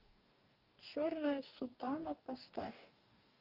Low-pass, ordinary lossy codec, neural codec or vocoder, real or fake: 5.4 kHz; Opus, 24 kbps; codec, 44.1 kHz, 2.6 kbps, DAC; fake